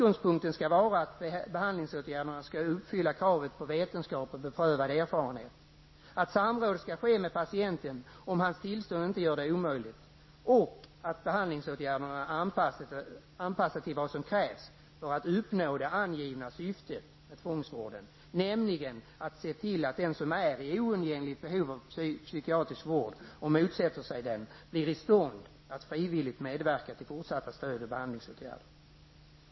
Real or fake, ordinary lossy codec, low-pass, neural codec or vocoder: real; MP3, 24 kbps; 7.2 kHz; none